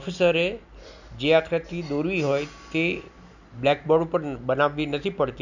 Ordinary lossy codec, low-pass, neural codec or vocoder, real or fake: none; 7.2 kHz; none; real